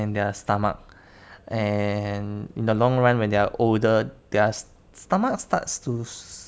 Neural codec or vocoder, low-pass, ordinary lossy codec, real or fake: none; none; none; real